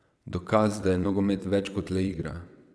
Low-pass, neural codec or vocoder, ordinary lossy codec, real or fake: none; vocoder, 22.05 kHz, 80 mel bands, WaveNeXt; none; fake